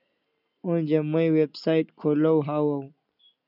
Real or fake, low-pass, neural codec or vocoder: real; 5.4 kHz; none